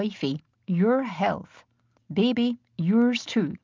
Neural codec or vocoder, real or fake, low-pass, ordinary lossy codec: none; real; 7.2 kHz; Opus, 24 kbps